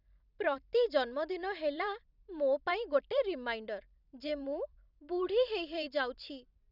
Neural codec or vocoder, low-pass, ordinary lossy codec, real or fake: vocoder, 44.1 kHz, 128 mel bands every 256 samples, BigVGAN v2; 5.4 kHz; none; fake